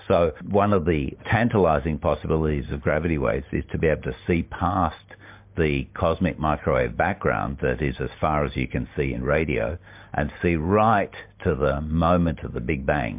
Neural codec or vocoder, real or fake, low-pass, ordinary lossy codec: none; real; 3.6 kHz; MP3, 32 kbps